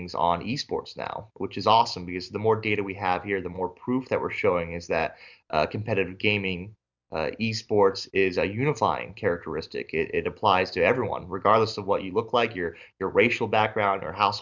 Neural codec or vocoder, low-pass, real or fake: none; 7.2 kHz; real